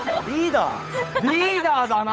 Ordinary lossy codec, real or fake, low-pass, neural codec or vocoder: none; fake; none; codec, 16 kHz, 8 kbps, FunCodec, trained on Chinese and English, 25 frames a second